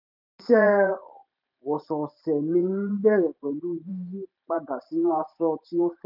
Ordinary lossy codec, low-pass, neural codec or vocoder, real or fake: none; 5.4 kHz; vocoder, 44.1 kHz, 128 mel bands every 512 samples, BigVGAN v2; fake